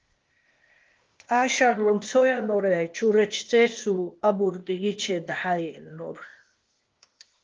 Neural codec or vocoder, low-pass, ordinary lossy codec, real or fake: codec, 16 kHz, 0.8 kbps, ZipCodec; 7.2 kHz; Opus, 32 kbps; fake